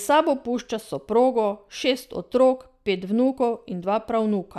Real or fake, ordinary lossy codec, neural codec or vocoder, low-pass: real; none; none; 14.4 kHz